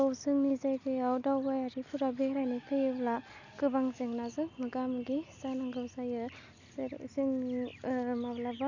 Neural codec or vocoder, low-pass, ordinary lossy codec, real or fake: none; 7.2 kHz; none; real